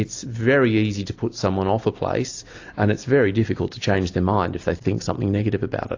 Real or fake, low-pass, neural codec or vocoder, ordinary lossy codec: real; 7.2 kHz; none; AAC, 48 kbps